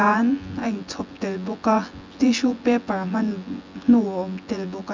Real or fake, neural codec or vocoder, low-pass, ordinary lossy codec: fake; vocoder, 24 kHz, 100 mel bands, Vocos; 7.2 kHz; MP3, 64 kbps